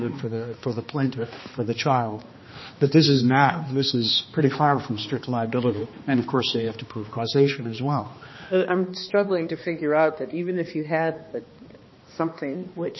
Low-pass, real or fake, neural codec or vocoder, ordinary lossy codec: 7.2 kHz; fake; codec, 16 kHz, 2 kbps, X-Codec, HuBERT features, trained on balanced general audio; MP3, 24 kbps